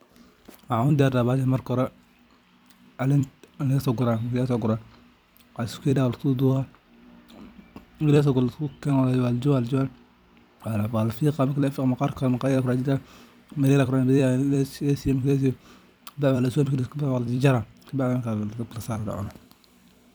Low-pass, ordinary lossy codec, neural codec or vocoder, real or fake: none; none; vocoder, 44.1 kHz, 128 mel bands every 256 samples, BigVGAN v2; fake